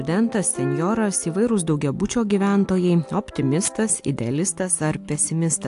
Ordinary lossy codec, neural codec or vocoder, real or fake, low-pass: AAC, 64 kbps; none; real; 10.8 kHz